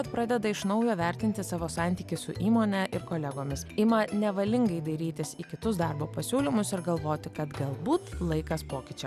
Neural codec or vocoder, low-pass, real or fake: none; 14.4 kHz; real